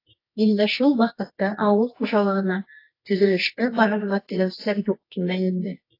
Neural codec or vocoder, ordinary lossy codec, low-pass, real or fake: codec, 24 kHz, 0.9 kbps, WavTokenizer, medium music audio release; AAC, 32 kbps; 5.4 kHz; fake